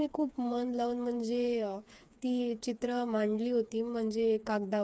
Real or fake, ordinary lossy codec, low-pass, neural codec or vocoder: fake; none; none; codec, 16 kHz, 4 kbps, FreqCodec, smaller model